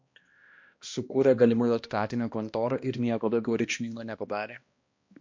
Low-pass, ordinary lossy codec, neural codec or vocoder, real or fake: 7.2 kHz; MP3, 48 kbps; codec, 16 kHz, 1 kbps, X-Codec, HuBERT features, trained on balanced general audio; fake